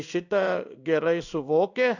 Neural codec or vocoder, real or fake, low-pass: codec, 16 kHz in and 24 kHz out, 1 kbps, XY-Tokenizer; fake; 7.2 kHz